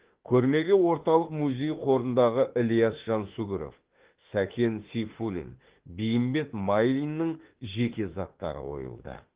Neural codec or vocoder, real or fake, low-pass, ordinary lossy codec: autoencoder, 48 kHz, 32 numbers a frame, DAC-VAE, trained on Japanese speech; fake; 3.6 kHz; Opus, 16 kbps